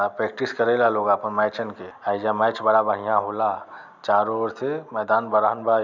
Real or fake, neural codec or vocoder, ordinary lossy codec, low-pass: real; none; none; 7.2 kHz